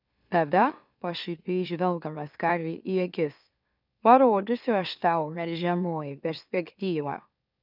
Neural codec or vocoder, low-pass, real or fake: autoencoder, 44.1 kHz, a latent of 192 numbers a frame, MeloTTS; 5.4 kHz; fake